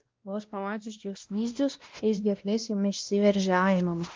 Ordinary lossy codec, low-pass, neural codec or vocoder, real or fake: Opus, 32 kbps; 7.2 kHz; codec, 16 kHz, 1 kbps, X-Codec, WavLM features, trained on Multilingual LibriSpeech; fake